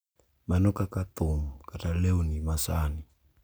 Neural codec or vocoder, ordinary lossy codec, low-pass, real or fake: none; none; none; real